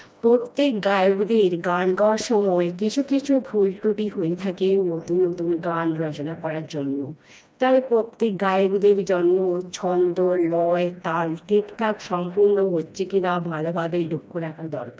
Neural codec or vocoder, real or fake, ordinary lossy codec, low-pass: codec, 16 kHz, 1 kbps, FreqCodec, smaller model; fake; none; none